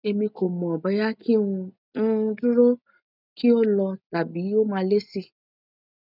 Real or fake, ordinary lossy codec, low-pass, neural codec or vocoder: real; none; 5.4 kHz; none